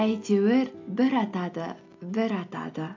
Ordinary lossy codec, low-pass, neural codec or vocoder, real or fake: AAC, 48 kbps; 7.2 kHz; none; real